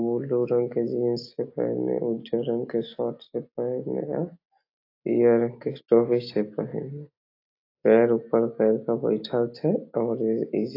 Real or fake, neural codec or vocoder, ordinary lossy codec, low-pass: real; none; AAC, 32 kbps; 5.4 kHz